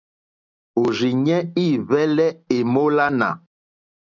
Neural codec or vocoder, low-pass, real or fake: none; 7.2 kHz; real